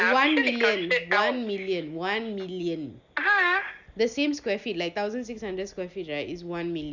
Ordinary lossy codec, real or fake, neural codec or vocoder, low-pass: none; real; none; 7.2 kHz